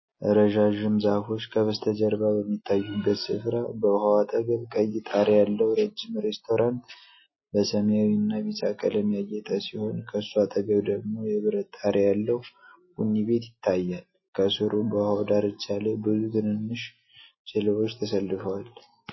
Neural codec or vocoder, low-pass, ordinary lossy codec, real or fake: none; 7.2 kHz; MP3, 24 kbps; real